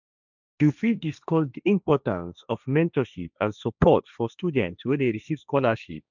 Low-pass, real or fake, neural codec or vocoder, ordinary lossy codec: 7.2 kHz; fake; codec, 16 kHz, 1.1 kbps, Voila-Tokenizer; none